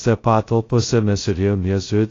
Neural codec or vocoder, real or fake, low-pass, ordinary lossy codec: codec, 16 kHz, 0.2 kbps, FocalCodec; fake; 7.2 kHz; AAC, 32 kbps